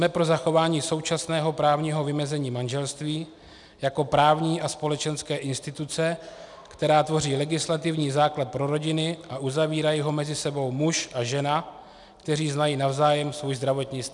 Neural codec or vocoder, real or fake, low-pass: none; real; 10.8 kHz